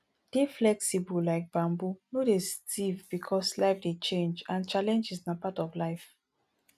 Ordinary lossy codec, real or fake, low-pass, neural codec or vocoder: none; real; 14.4 kHz; none